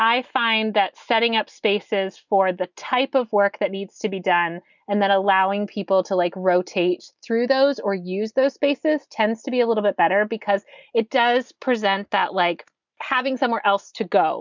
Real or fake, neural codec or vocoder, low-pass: real; none; 7.2 kHz